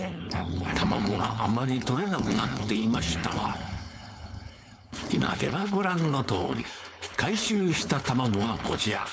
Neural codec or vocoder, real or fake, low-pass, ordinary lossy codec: codec, 16 kHz, 4.8 kbps, FACodec; fake; none; none